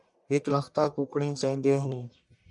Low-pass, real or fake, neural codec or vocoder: 10.8 kHz; fake; codec, 44.1 kHz, 1.7 kbps, Pupu-Codec